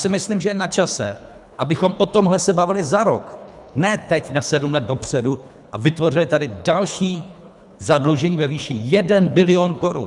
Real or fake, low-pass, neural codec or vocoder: fake; 10.8 kHz; codec, 24 kHz, 3 kbps, HILCodec